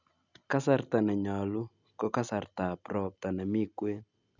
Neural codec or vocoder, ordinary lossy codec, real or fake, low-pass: none; none; real; 7.2 kHz